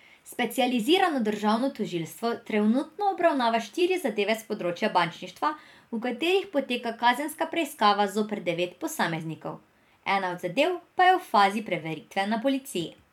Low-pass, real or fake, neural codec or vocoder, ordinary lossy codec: 19.8 kHz; fake; vocoder, 44.1 kHz, 128 mel bands every 256 samples, BigVGAN v2; MP3, 96 kbps